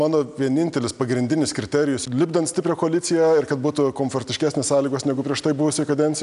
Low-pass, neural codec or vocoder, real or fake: 10.8 kHz; none; real